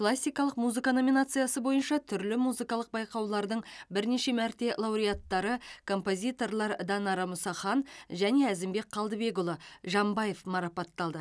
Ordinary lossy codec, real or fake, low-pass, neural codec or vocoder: none; real; none; none